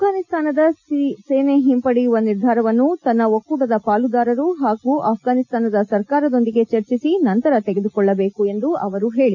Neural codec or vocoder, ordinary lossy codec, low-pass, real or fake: none; none; 7.2 kHz; real